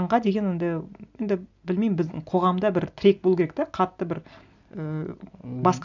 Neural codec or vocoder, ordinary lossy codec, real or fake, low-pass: none; none; real; 7.2 kHz